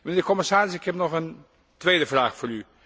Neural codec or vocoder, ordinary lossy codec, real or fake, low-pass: none; none; real; none